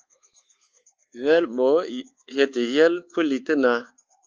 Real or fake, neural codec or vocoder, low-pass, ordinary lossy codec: fake; codec, 24 kHz, 1.2 kbps, DualCodec; 7.2 kHz; Opus, 24 kbps